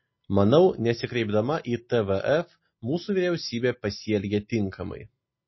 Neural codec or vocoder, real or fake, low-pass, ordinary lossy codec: none; real; 7.2 kHz; MP3, 24 kbps